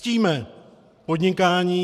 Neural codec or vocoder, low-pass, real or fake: vocoder, 44.1 kHz, 128 mel bands every 512 samples, BigVGAN v2; 14.4 kHz; fake